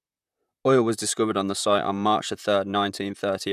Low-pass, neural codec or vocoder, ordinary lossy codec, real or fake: 14.4 kHz; vocoder, 44.1 kHz, 128 mel bands, Pupu-Vocoder; none; fake